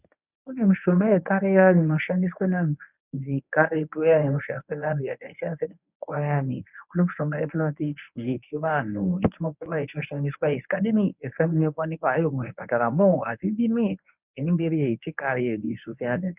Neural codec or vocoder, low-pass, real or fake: codec, 24 kHz, 0.9 kbps, WavTokenizer, medium speech release version 1; 3.6 kHz; fake